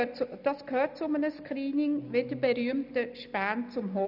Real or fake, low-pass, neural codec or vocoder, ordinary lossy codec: real; 5.4 kHz; none; none